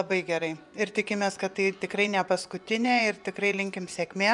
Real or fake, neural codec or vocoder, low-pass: fake; vocoder, 24 kHz, 100 mel bands, Vocos; 10.8 kHz